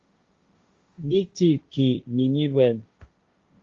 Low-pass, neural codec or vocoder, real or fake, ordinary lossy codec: 7.2 kHz; codec, 16 kHz, 1.1 kbps, Voila-Tokenizer; fake; Opus, 32 kbps